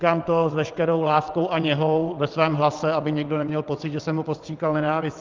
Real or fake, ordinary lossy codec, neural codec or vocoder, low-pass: fake; Opus, 24 kbps; vocoder, 22.05 kHz, 80 mel bands, WaveNeXt; 7.2 kHz